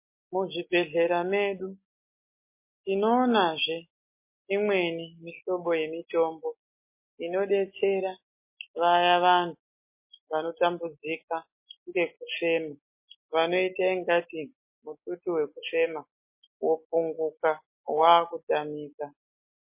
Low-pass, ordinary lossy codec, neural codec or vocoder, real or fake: 3.6 kHz; MP3, 24 kbps; none; real